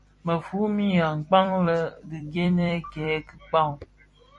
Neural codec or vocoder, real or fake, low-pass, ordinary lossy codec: vocoder, 44.1 kHz, 128 mel bands every 256 samples, BigVGAN v2; fake; 10.8 kHz; MP3, 48 kbps